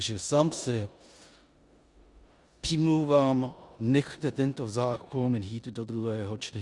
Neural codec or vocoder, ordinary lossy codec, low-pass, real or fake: codec, 16 kHz in and 24 kHz out, 0.9 kbps, LongCat-Audio-Codec, four codebook decoder; Opus, 64 kbps; 10.8 kHz; fake